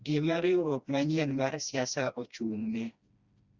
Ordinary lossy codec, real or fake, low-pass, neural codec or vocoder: Opus, 64 kbps; fake; 7.2 kHz; codec, 16 kHz, 1 kbps, FreqCodec, smaller model